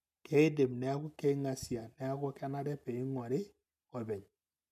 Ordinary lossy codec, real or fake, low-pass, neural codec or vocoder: MP3, 96 kbps; real; 14.4 kHz; none